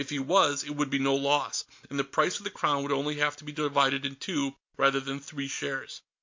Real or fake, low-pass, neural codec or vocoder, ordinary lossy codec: real; 7.2 kHz; none; MP3, 48 kbps